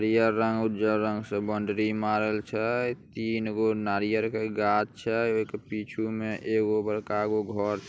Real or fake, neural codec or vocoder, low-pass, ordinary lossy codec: real; none; none; none